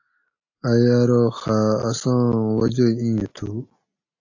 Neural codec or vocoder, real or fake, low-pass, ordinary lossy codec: none; real; 7.2 kHz; AAC, 48 kbps